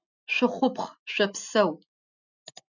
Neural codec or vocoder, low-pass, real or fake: none; 7.2 kHz; real